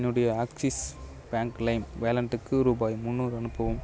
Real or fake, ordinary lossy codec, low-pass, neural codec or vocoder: real; none; none; none